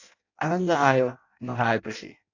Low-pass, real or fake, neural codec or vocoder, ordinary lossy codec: 7.2 kHz; fake; codec, 16 kHz in and 24 kHz out, 0.6 kbps, FireRedTTS-2 codec; AAC, 48 kbps